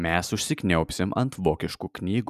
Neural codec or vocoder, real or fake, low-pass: none; real; 14.4 kHz